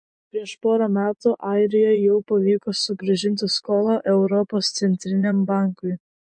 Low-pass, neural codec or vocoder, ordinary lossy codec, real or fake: 9.9 kHz; vocoder, 24 kHz, 100 mel bands, Vocos; MP3, 48 kbps; fake